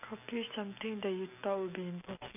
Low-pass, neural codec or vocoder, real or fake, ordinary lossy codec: 3.6 kHz; none; real; none